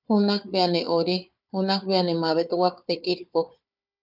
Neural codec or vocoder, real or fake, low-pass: codec, 16 kHz, 4 kbps, FunCodec, trained on Chinese and English, 50 frames a second; fake; 5.4 kHz